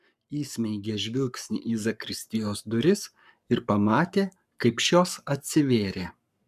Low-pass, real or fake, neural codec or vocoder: 14.4 kHz; fake; codec, 44.1 kHz, 7.8 kbps, Pupu-Codec